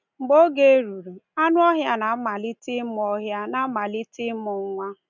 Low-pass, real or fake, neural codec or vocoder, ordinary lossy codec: 7.2 kHz; real; none; none